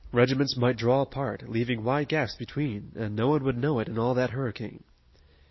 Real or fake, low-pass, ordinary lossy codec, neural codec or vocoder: real; 7.2 kHz; MP3, 24 kbps; none